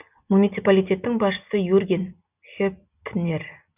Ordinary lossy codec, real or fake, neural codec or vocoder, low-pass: none; real; none; 3.6 kHz